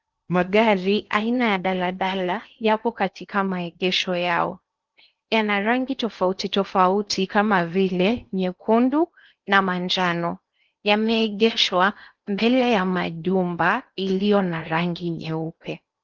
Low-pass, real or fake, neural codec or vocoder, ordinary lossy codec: 7.2 kHz; fake; codec, 16 kHz in and 24 kHz out, 0.8 kbps, FocalCodec, streaming, 65536 codes; Opus, 32 kbps